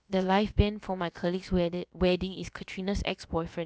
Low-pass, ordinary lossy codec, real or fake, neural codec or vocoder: none; none; fake; codec, 16 kHz, about 1 kbps, DyCAST, with the encoder's durations